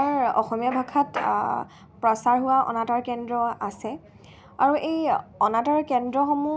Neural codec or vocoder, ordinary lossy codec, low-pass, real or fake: none; none; none; real